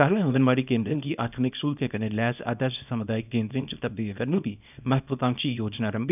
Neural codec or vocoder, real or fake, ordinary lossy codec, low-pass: codec, 24 kHz, 0.9 kbps, WavTokenizer, small release; fake; none; 3.6 kHz